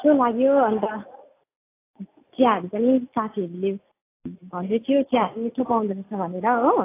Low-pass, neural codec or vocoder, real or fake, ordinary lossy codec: 3.6 kHz; none; real; AAC, 24 kbps